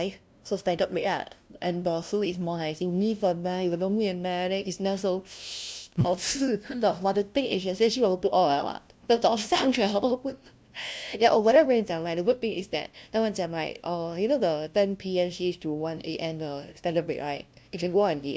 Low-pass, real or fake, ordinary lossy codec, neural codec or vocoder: none; fake; none; codec, 16 kHz, 0.5 kbps, FunCodec, trained on LibriTTS, 25 frames a second